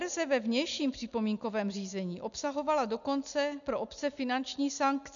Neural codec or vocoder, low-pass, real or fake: none; 7.2 kHz; real